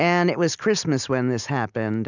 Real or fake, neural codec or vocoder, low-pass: real; none; 7.2 kHz